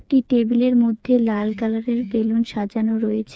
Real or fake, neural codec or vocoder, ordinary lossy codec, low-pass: fake; codec, 16 kHz, 4 kbps, FreqCodec, smaller model; none; none